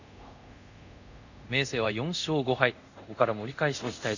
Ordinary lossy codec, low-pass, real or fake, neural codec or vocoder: none; 7.2 kHz; fake; codec, 24 kHz, 0.5 kbps, DualCodec